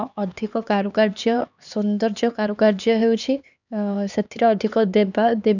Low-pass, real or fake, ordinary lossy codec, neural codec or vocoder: 7.2 kHz; fake; none; codec, 16 kHz, 2 kbps, X-Codec, HuBERT features, trained on LibriSpeech